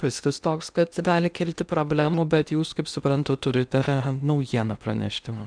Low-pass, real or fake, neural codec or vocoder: 9.9 kHz; fake; codec, 16 kHz in and 24 kHz out, 0.8 kbps, FocalCodec, streaming, 65536 codes